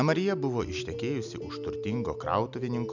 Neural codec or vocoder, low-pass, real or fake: none; 7.2 kHz; real